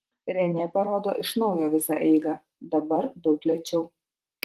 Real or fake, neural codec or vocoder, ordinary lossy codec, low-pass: fake; vocoder, 44.1 kHz, 128 mel bands, Pupu-Vocoder; Opus, 24 kbps; 14.4 kHz